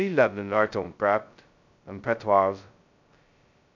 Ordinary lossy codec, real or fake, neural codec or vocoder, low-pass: none; fake; codec, 16 kHz, 0.2 kbps, FocalCodec; 7.2 kHz